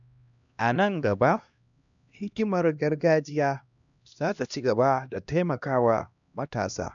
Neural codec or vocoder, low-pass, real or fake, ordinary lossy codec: codec, 16 kHz, 1 kbps, X-Codec, HuBERT features, trained on LibriSpeech; 7.2 kHz; fake; none